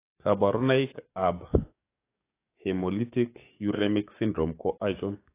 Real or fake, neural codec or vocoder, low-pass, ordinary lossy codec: fake; codec, 44.1 kHz, 7.8 kbps, DAC; 3.6 kHz; AAC, 24 kbps